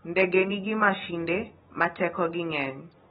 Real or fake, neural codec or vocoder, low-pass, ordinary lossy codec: real; none; 7.2 kHz; AAC, 16 kbps